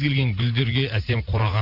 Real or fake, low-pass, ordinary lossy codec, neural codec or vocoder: fake; 5.4 kHz; none; vocoder, 44.1 kHz, 128 mel bands every 512 samples, BigVGAN v2